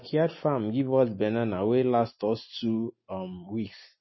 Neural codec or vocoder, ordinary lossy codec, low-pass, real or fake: none; MP3, 24 kbps; 7.2 kHz; real